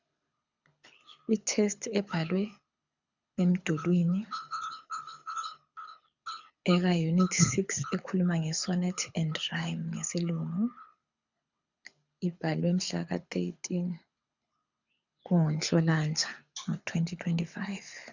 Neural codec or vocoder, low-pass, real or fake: codec, 24 kHz, 6 kbps, HILCodec; 7.2 kHz; fake